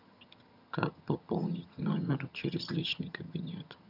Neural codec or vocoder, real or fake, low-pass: vocoder, 22.05 kHz, 80 mel bands, HiFi-GAN; fake; 5.4 kHz